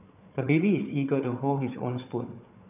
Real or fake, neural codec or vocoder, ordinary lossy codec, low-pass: fake; codec, 16 kHz, 4 kbps, FunCodec, trained on Chinese and English, 50 frames a second; none; 3.6 kHz